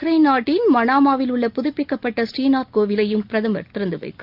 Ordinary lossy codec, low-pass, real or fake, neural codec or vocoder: Opus, 24 kbps; 5.4 kHz; real; none